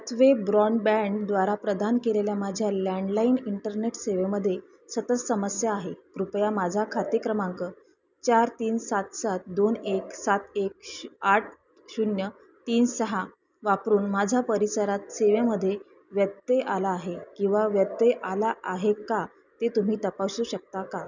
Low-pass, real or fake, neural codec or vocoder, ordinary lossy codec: 7.2 kHz; real; none; none